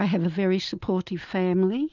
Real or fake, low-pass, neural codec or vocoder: real; 7.2 kHz; none